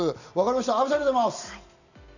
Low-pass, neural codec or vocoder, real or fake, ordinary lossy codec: 7.2 kHz; none; real; none